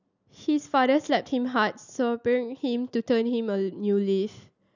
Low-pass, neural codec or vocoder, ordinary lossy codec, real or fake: 7.2 kHz; none; none; real